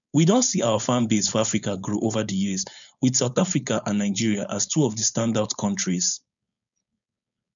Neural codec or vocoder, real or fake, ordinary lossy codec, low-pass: codec, 16 kHz, 4.8 kbps, FACodec; fake; none; 7.2 kHz